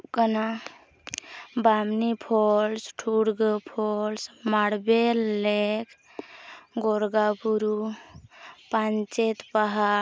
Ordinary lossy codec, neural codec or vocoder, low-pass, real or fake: none; none; none; real